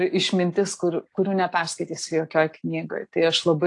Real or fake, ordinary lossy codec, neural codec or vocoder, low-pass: real; AAC, 48 kbps; none; 10.8 kHz